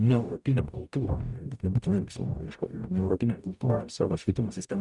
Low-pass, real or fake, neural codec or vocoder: 10.8 kHz; fake; codec, 44.1 kHz, 0.9 kbps, DAC